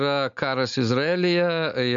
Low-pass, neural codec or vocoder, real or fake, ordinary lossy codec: 7.2 kHz; none; real; MP3, 64 kbps